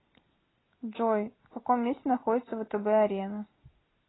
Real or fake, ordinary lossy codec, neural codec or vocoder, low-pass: real; AAC, 16 kbps; none; 7.2 kHz